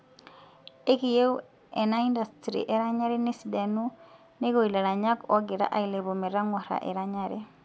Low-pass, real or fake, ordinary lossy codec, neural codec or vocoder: none; real; none; none